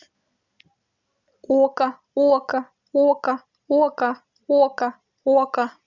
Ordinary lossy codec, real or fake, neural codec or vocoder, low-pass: none; real; none; 7.2 kHz